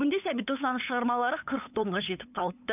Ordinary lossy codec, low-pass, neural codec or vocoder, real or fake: none; 3.6 kHz; codec, 16 kHz, 8 kbps, FunCodec, trained on LibriTTS, 25 frames a second; fake